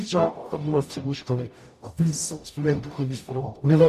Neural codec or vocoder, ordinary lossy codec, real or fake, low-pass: codec, 44.1 kHz, 0.9 kbps, DAC; MP3, 64 kbps; fake; 14.4 kHz